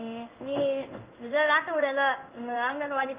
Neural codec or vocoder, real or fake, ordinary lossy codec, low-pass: codec, 16 kHz in and 24 kHz out, 1 kbps, XY-Tokenizer; fake; Opus, 24 kbps; 3.6 kHz